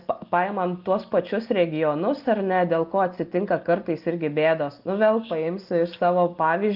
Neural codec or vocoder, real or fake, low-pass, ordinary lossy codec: none; real; 5.4 kHz; Opus, 32 kbps